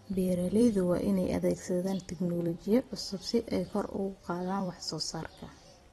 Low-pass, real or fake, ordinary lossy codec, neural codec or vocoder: 19.8 kHz; fake; AAC, 32 kbps; vocoder, 44.1 kHz, 128 mel bands every 256 samples, BigVGAN v2